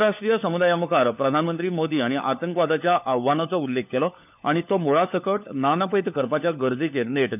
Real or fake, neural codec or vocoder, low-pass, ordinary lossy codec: fake; codec, 16 kHz, 4 kbps, FunCodec, trained on LibriTTS, 50 frames a second; 3.6 kHz; none